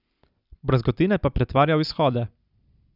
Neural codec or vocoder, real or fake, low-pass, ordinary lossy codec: none; real; 5.4 kHz; none